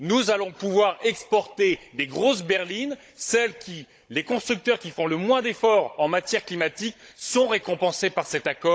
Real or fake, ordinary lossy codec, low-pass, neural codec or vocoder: fake; none; none; codec, 16 kHz, 16 kbps, FunCodec, trained on Chinese and English, 50 frames a second